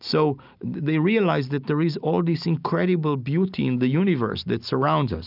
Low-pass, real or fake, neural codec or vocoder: 5.4 kHz; real; none